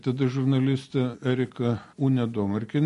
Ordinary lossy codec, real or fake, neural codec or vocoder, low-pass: MP3, 48 kbps; real; none; 14.4 kHz